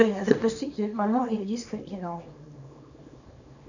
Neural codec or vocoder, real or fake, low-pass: codec, 24 kHz, 0.9 kbps, WavTokenizer, small release; fake; 7.2 kHz